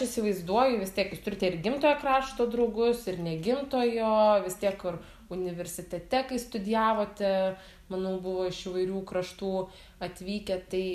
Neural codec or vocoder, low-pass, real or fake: none; 14.4 kHz; real